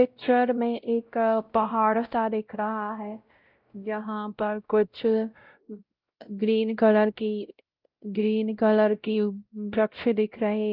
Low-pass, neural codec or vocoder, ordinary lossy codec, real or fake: 5.4 kHz; codec, 16 kHz, 0.5 kbps, X-Codec, WavLM features, trained on Multilingual LibriSpeech; Opus, 32 kbps; fake